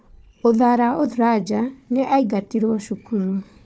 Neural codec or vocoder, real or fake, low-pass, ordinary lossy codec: codec, 16 kHz, 4 kbps, FunCodec, trained on Chinese and English, 50 frames a second; fake; none; none